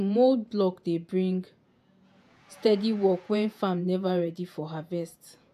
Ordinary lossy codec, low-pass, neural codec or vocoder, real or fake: AAC, 96 kbps; 14.4 kHz; vocoder, 48 kHz, 128 mel bands, Vocos; fake